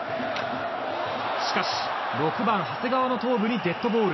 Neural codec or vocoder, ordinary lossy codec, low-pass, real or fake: none; MP3, 24 kbps; 7.2 kHz; real